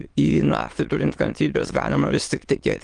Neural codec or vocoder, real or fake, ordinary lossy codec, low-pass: autoencoder, 22.05 kHz, a latent of 192 numbers a frame, VITS, trained on many speakers; fake; Opus, 24 kbps; 9.9 kHz